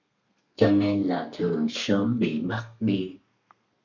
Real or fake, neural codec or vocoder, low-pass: fake; codec, 32 kHz, 1.9 kbps, SNAC; 7.2 kHz